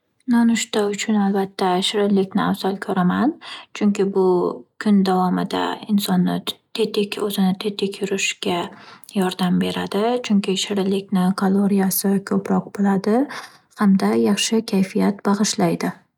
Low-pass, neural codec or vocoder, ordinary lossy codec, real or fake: 19.8 kHz; none; none; real